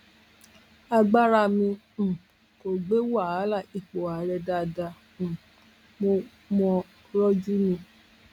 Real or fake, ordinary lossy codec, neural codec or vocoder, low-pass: real; none; none; 19.8 kHz